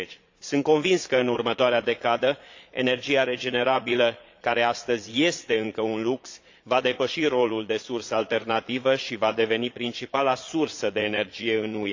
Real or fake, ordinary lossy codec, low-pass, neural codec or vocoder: fake; AAC, 48 kbps; 7.2 kHz; vocoder, 22.05 kHz, 80 mel bands, Vocos